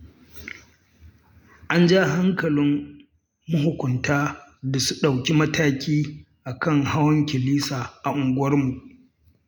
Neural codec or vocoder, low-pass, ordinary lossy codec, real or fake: none; 19.8 kHz; none; real